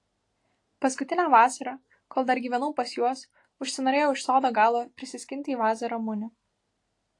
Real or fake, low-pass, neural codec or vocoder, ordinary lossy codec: real; 10.8 kHz; none; AAC, 48 kbps